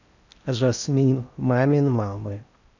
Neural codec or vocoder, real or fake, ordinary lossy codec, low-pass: codec, 16 kHz in and 24 kHz out, 0.6 kbps, FocalCodec, streaming, 4096 codes; fake; none; 7.2 kHz